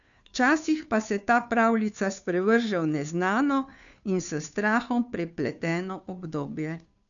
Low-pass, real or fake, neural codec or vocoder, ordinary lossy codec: 7.2 kHz; fake; codec, 16 kHz, 2 kbps, FunCodec, trained on Chinese and English, 25 frames a second; none